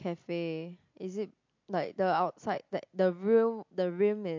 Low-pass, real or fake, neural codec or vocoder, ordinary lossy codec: 7.2 kHz; real; none; MP3, 48 kbps